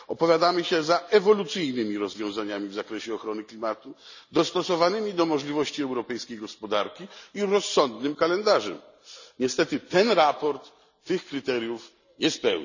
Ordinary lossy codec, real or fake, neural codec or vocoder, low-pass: none; real; none; 7.2 kHz